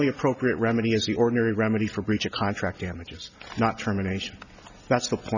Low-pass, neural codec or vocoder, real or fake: 7.2 kHz; none; real